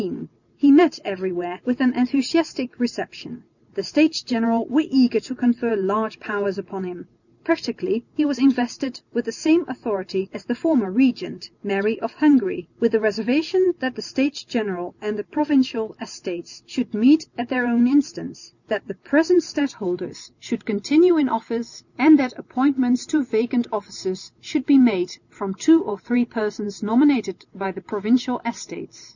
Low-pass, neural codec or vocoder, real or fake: 7.2 kHz; none; real